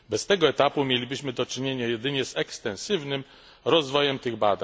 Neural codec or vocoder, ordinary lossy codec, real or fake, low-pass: none; none; real; none